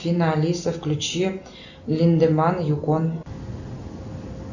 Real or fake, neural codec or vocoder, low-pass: real; none; 7.2 kHz